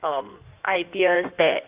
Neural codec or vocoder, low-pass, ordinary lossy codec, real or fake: codec, 16 kHz in and 24 kHz out, 1.1 kbps, FireRedTTS-2 codec; 3.6 kHz; Opus, 24 kbps; fake